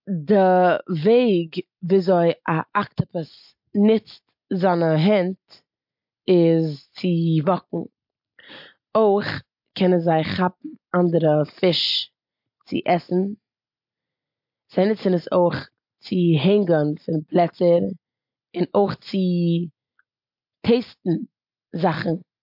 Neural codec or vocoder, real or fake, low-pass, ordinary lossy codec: none; real; 5.4 kHz; MP3, 48 kbps